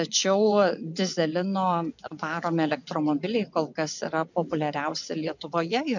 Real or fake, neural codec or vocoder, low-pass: fake; vocoder, 24 kHz, 100 mel bands, Vocos; 7.2 kHz